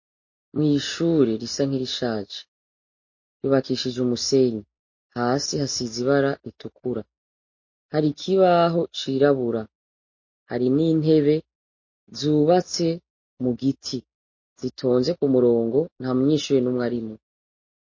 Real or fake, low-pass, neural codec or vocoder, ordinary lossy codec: real; 7.2 kHz; none; MP3, 32 kbps